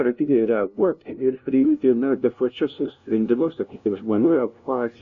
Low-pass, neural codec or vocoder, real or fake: 7.2 kHz; codec, 16 kHz, 0.5 kbps, FunCodec, trained on LibriTTS, 25 frames a second; fake